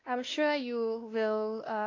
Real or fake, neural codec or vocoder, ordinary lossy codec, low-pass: fake; codec, 16 kHz, 1 kbps, X-Codec, WavLM features, trained on Multilingual LibriSpeech; AAC, 32 kbps; 7.2 kHz